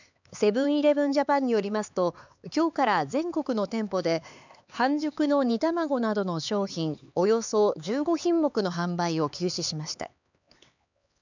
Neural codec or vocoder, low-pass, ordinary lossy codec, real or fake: codec, 16 kHz, 4 kbps, X-Codec, HuBERT features, trained on LibriSpeech; 7.2 kHz; none; fake